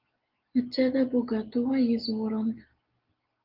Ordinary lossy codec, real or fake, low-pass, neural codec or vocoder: Opus, 32 kbps; real; 5.4 kHz; none